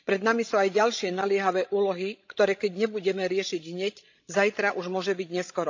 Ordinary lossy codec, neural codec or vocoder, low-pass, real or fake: AAC, 48 kbps; codec, 16 kHz, 16 kbps, FreqCodec, larger model; 7.2 kHz; fake